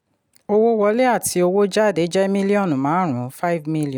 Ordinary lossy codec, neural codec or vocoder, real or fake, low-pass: none; none; real; 19.8 kHz